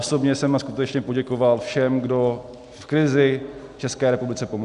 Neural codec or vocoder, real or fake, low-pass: none; real; 10.8 kHz